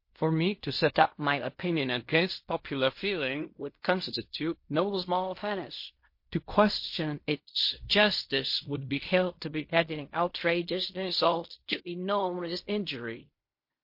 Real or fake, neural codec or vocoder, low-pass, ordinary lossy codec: fake; codec, 16 kHz in and 24 kHz out, 0.4 kbps, LongCat-Audio-Codec, fine tuned four codebook decoder; 5.4 kHz; MP3, 32 kbps